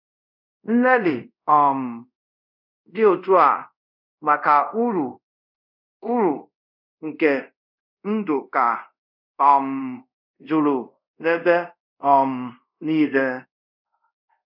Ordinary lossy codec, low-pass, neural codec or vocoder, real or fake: none; 5.4 kHz; codec, 24 kHz, 0.5 kbps, DualCodec; fake